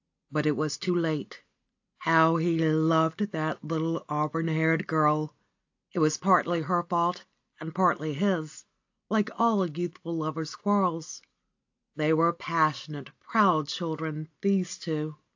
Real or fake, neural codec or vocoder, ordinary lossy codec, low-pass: real; none; AAC, 48 kbps; 7.2 kHz